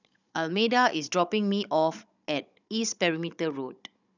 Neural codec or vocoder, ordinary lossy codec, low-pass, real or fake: codec, 16 kHz, 16 kbps, FunCodec, trained on Chinese and English, 50 frames a second; none; 7.2 kHz; fake